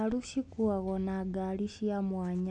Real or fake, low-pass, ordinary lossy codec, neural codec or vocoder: real; 10.8 kHz; none; none